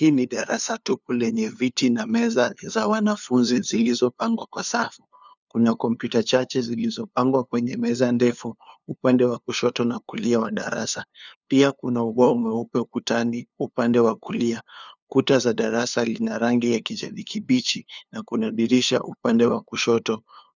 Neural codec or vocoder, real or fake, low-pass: codec, 16 kHz, 2 kbps, FunCodec, trained on LibriTTS, 25 frames a second; fake; 7.2 kHz